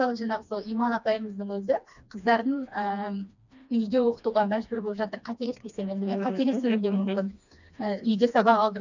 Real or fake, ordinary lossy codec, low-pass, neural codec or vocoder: fake; none; 7.2 kHz; codec, 16 kHz, 2 kbps, FreqCodec, smaller model